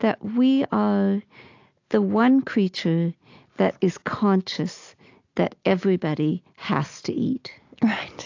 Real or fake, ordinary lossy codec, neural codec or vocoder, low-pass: real; AAC, 48 kbps; none; 7.2 kHz